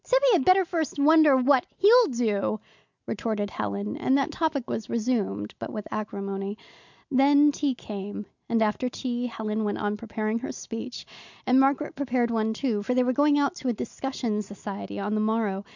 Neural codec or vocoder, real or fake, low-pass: none; real; 7.2 kHz